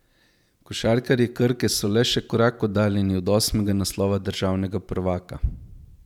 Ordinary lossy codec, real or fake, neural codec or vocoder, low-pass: none; fake; vocoder, 44.1 kHz, 128 mel bands every 512 samples, BigVGAN v2; 19.8 kHz